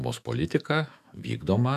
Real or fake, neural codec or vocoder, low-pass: fake; autoencoder, 48 kHz, 128 numbers a frame, DAC-VAE, trained on Japanese speech; 14.4 kHz